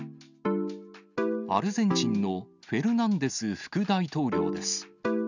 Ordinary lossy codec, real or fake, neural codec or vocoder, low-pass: none; real; none; 7.2 kHz